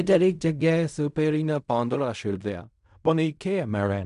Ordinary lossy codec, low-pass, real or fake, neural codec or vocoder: none; 10.8 kHz; fake; codec, 16 kHz in and 24 kHz out, 0.4 kbps, LongCat-Audio-Codec, fine tuned four codebook decoder